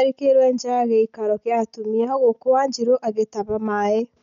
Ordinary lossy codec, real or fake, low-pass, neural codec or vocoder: MP3, 96 kbps; real; 7.2 kHz; none